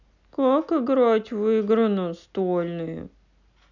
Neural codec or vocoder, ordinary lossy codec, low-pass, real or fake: none; none; 7.2 kHz; real